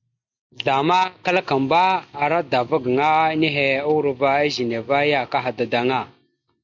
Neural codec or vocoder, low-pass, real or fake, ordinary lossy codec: none; 7.2 kHz; real; MP3, 64 kbps